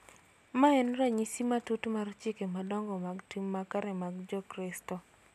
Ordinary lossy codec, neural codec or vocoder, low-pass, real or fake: none; none; 14.4 kHz; real